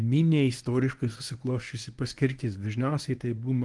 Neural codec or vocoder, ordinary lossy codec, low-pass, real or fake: codec, 24 kHz, 0.9 kbps, WavTokenizer, medium speech release version 1; Opus, 24 kbps; 10.8 kHz; fake